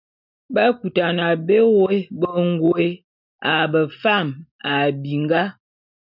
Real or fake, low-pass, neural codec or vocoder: real; 5.4 kHz; none